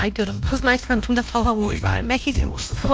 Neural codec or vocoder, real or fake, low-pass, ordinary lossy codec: codec, 16 kHz, 1 kbps, X-Codec, WavLM features, trained on Multilingual LibriSpeech; fake; none; none